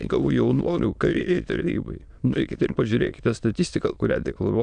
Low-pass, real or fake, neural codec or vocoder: 9.9 kHz; fake; autoencoder, 22.05 kHz, a latent of 192 numbers a frame, VITS, trained on many speakers